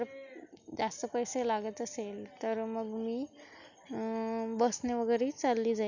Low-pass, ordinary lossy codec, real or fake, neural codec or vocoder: 7.2 kHz; none; real; none